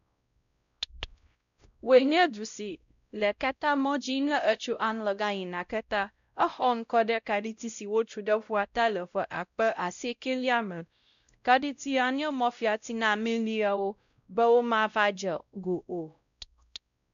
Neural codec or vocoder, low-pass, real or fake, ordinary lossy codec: codec, 16 kHz, 0.5 kbps, X-Codec, WavLM features, trained on Multilingual LibriSpeech; 7.2 kHz; fake; none